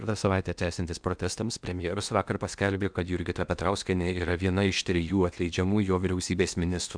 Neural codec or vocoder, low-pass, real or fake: codec, 16 kHz in and 24 kHz out, 0.8 kbps, FocalCodec, streaming, 65536 codes; 9.9 kHz; fake